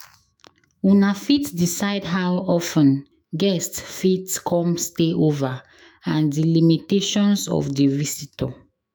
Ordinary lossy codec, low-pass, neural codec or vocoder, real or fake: none; none; autoencoder, 48 kHz, 128 numbers a frame, DAC-VAE, trained on Japanese speech; fake